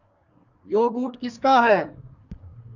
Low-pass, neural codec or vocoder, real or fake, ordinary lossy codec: 7.2 kHz; codec, 24 kHz, 1 kbps, SNAC; fake; Opus, 64 kbps